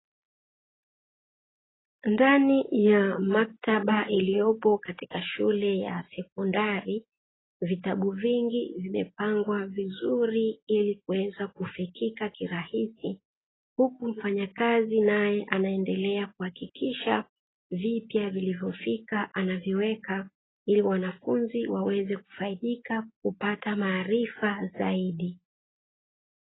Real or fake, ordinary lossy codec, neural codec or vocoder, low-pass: real; AAC, 16 kbps; none; 7.2 kHz